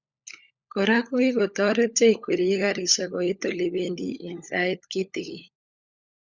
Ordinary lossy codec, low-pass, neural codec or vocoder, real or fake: Opus, 64 kbps; 7.2 kHz; codec, 16 kHz, 16 kbps, FunCodec, trained on LibriTTS, 50 frames a second; fake